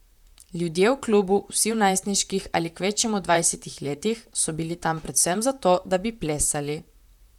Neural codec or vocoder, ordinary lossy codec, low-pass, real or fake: vocoder, 44.1 kHz, 128 mel bands, Pupu-Vocoder; none; 19.8 kHz; fake